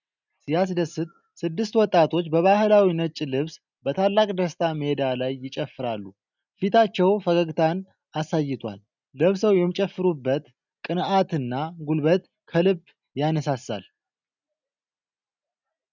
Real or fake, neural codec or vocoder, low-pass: real; none; 7.2 kHz